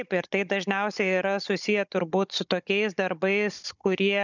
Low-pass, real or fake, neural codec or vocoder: 7.2 kHz; fake; codec, 16 kHz, 16 kbps, FunCodec, trained on Chinese and English, 50 frames a second